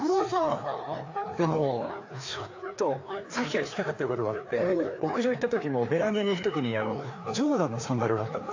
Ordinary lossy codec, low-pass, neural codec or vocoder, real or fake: AAC, 48 kbps; 7.2 kHz; codec, 16 kHz, 2 kbps, FreqCodec, larger model; fake